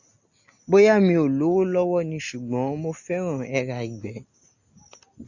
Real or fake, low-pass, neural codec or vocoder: real; 7.2 kHz; none